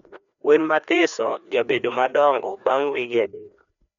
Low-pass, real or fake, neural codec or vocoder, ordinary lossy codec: 7.2 kHz; fake; codec, 16 kHz, 2 kbps, FreqCodec, larger model; none